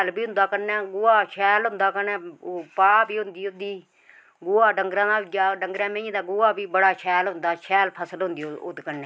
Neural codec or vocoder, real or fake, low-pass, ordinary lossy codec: none; real; none; none